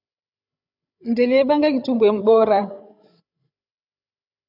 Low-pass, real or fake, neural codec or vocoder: 5.4 kHz; fake; codec, 16 kHz, 16 kbps, FreqCodec, larger model